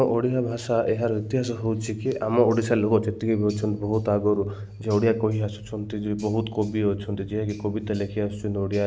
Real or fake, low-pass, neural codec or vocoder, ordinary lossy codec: real; none; none; none